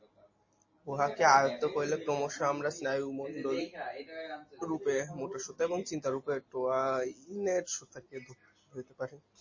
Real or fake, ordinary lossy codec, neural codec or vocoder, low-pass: real; MP3, 32 kbps; none; 7.2 kHz